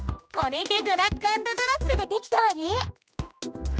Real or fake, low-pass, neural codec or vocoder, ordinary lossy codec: fake; none; codec, 16 kHz, 1 kbps, X-Codec, HuBERT features, trained on general audio; none